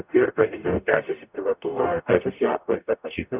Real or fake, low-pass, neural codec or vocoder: fake; 3.6 kHz; codec, 44.1 kHz, 0.9 kbps, DAC